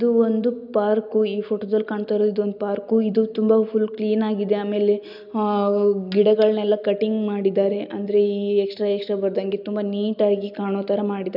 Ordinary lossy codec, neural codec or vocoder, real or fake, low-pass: none; none; real; 5.4 kHz